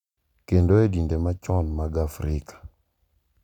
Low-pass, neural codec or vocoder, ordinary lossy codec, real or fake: 19.8 kHz; none; none; real